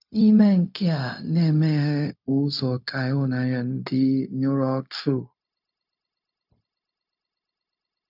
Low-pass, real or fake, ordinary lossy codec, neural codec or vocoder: 5.4 kHz; fake; none; codec, 16 kHz, 0.4 kbps, LongCat-Audio-Codec